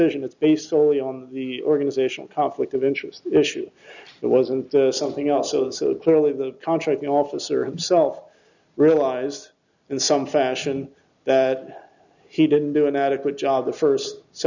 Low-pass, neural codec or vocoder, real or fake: 7.2 kHz; none; real